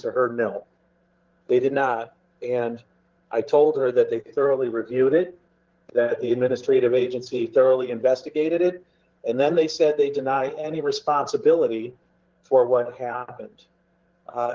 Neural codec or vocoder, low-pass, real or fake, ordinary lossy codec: codec, 16 kHz, 16 kbps, FreqCodec, larger model; 7.2 kHz; fake; Opus, 16 kbps